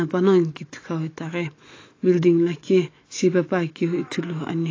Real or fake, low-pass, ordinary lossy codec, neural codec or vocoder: fake; 7.2 kHz; AAC, 32 kbps; autoencoder, 48 kHz, 128 numbers a frame, DAC-VAE, trained on Japanese speech